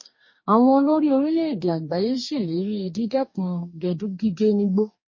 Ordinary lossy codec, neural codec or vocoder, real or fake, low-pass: MP3, 32 kbps; codec, 44.1 kHz, 2.6 kbps, DAC; fake; 7.2 kHz